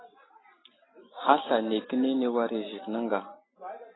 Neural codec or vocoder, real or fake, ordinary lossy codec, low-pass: none; real; AAC, 16 kbps; 7.2 kHz